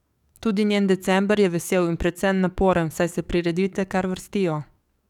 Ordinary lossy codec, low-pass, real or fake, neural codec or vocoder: none; 19.8 kHz; fake; codec, 44.1 kHz, 7.8 kbps, DAC